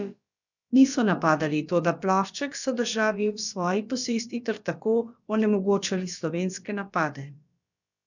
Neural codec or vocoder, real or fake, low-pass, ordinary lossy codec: codec, 16 kHz, about 1 kbps, DyCAST, with the encoder's durations; fake; 7.2 kHz; none